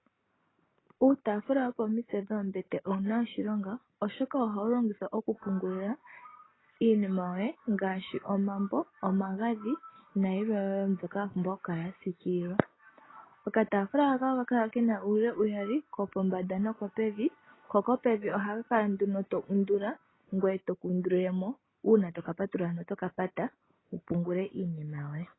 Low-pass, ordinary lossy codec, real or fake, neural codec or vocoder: 7.2 kHz; AAC, 16 kbps; real; none